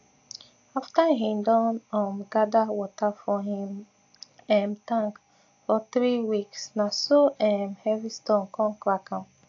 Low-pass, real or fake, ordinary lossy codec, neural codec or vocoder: 7.2 kHz; real; AAC, 48 kbps; none